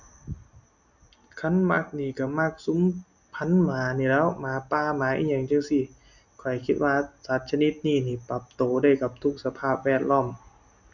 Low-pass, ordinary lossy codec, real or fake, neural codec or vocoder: 7.2 kHz; none; real; none